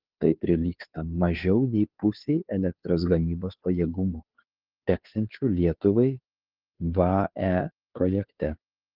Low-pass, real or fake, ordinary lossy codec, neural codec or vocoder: 5.4 kHz; fake; Opus, 32 kbps; codec, 16 kHz, 2 kbps, FunCodec, trained on Chinese and English, 25 frames a second